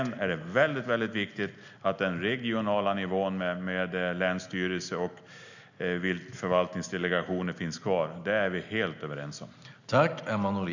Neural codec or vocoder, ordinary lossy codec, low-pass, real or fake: none; none; 7.2 kHz; real